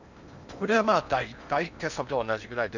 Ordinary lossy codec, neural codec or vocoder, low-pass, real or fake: none; codec, 16 kHz in and 24 kHz out, 0.8 kbps, FocalCodec, streaming, 65536 codes; 7.2 kHz; fake